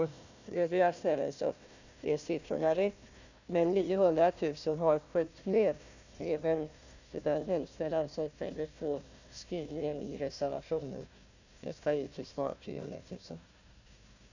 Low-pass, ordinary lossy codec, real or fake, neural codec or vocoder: 7.2 kHz; none; fake; codec, 16 kHz, 1 kbps, FunCodec, trained on Chinese and English, 50 frames a second